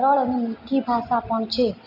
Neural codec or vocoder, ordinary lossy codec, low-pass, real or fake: none; MP3, 48 kbps; 5.4 kHz; real